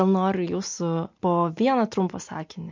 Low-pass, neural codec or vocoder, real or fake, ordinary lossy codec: 7.2 kHz; none; real; MP3, 48 kbps